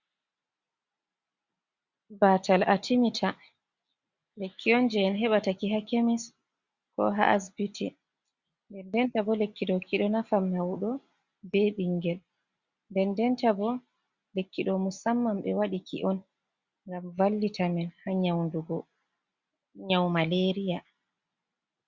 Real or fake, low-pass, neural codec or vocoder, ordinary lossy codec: real; 7.2 kHz; none; Opus, 64 kbps